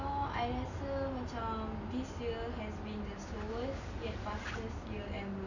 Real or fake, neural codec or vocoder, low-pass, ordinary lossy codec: real; none; 7.2 kHz; none